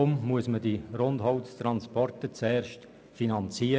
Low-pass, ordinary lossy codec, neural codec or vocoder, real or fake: none; none; none; real